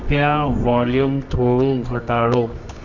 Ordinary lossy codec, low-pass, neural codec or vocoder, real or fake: none; 7.2 kHz; codec, 24 kHz, 0.9 kbps, WavTokenizer, medium music audio release; fake